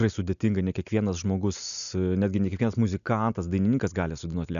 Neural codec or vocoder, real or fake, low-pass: none; real; 7.2 kHz